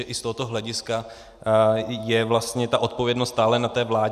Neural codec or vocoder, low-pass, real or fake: none; 14.4 kHz; real